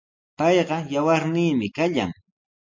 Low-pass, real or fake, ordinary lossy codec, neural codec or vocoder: 7.2 kHz; real; MP3, 48 kbps; none